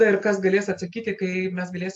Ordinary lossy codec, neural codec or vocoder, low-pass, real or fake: AAC, 64 kbps; none; 10.8 kHz; real